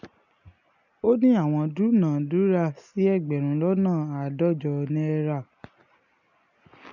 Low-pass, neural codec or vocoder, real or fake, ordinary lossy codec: 7.2 kHz; none; real; none